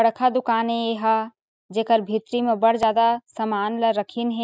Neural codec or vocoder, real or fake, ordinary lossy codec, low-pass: none; real; none; none